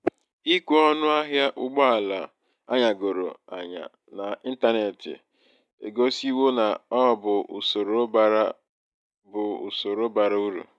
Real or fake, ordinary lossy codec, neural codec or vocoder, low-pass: real; none; none; none